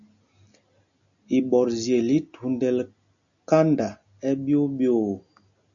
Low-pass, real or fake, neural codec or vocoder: 7.2 kHz; real; none